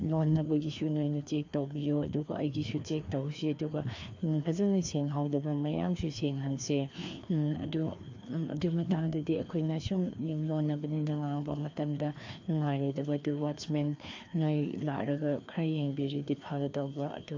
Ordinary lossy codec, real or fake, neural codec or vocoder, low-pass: AAC, 48 kbps; fake; codec, 16 kHz, 2 kbps, FreqCodec, larger model; 7.2 kHz